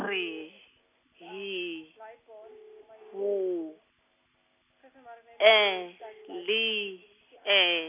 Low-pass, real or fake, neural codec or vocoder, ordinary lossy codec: 3.6 kHz; real; none; none